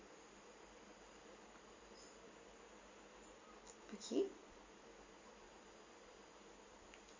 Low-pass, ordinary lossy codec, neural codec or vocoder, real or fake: 7.2 kHz; MP3, 32 kbps; none; real